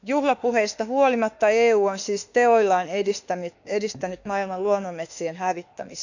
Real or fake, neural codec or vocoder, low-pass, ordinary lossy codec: fake; autoencoder, 48 kHz, 32 numbers a frame, DAC-VAE, trained on Japanese speech; 7.2 kHz; none